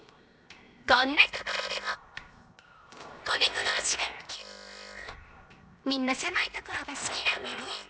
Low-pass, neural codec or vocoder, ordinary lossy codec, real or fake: none; codec, 16 kHz, 0.7 kbps, FocalCodec; none; fake